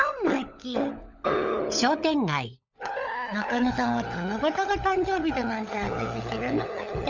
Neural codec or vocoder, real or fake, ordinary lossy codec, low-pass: codec, 16 kHz, 16 kbps, FunCodec, trained on LibriTTS, 50 frames a second; fake; none; 7.2 kHz